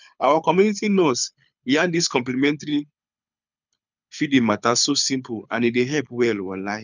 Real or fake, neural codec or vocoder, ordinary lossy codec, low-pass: fake; codec, 24 kHz, 6 kbps, HILCodec; none; 7.2 kHz